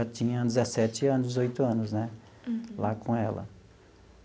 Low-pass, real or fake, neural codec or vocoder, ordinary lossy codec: none; real; none; none